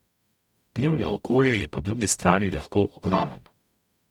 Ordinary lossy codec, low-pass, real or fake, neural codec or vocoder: none; 19.8 kHz; fake; codec, 44.1 kHz, 0.9 kbps, DAC